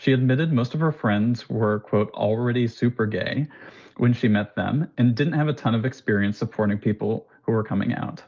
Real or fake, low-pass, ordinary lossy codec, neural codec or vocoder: real; 7.2 kHz; Opus, 24 kbps; none